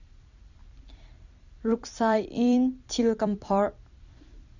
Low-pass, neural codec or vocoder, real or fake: 7.2 kHz; vocoder, 44.1 kHz, 128 mel bands every 256 samples, BigVGAN v2; fake